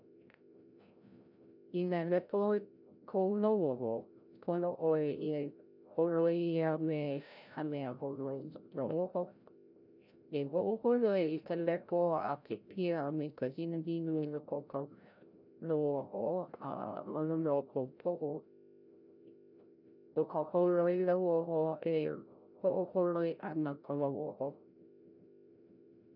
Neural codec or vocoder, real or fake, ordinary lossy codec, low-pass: codec, 16 kHz, 0.5 kbps, FreqCodec, larger model; fake; none; 5.4 kHz